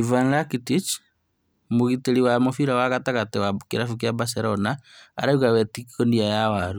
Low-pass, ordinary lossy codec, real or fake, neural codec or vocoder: none; none; real; none